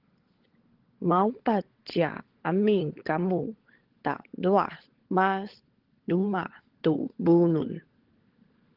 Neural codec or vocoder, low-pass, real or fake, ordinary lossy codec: codec, 16 kHz, 16 kbps, FunCodec, trained on LibriTTS, 50 frames a second; 5.4 kHz; fake; Opus, 16 kbps